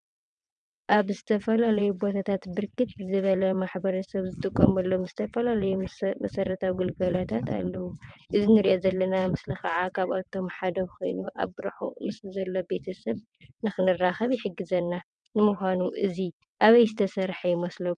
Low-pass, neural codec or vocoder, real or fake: 9.9 kHz; vocoder, 22.05 kHz, 80 mel bands, WaveNeXt; fake